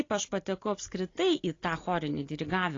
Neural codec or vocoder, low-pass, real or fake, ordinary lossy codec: none; 7.2 kHz; real; AAC, 32 kbps